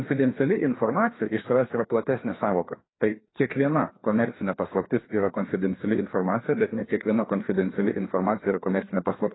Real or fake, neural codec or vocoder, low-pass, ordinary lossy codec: fake; codec, 16 kHz, 2 kbps, FreqCodec, larger model; 7.2 kHz; AAC, 16 kbps